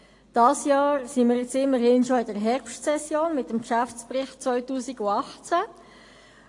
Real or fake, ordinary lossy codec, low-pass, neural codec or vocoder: real; AAC, 48 kbps; 10.8 kHz; none